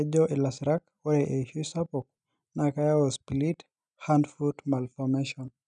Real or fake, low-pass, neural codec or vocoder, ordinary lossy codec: real; 9.9 kHz; none; none